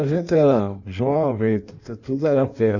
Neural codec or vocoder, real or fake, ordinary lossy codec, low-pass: codec, 16 kHz in and 24 kHz out, 1.1 kbps, FireRedTTS-2 codec; fake; none; 7.2 kHz